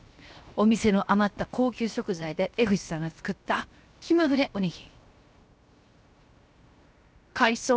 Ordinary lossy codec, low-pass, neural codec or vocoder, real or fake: none; none; codec, 16 kHz, 0.7 kbps, FocalCodec; fake